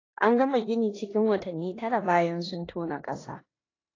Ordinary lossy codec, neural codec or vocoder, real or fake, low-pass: AAC, 32 kbps; codec, 16 kHz in and 24 kHz out, 0.9 kbps, LongCat-Audio-Codec, four codebook decoder; fake; 7.2 kHz